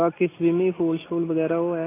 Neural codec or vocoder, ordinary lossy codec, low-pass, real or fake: none; AAC, 16 kbps; 3.6 kHz; real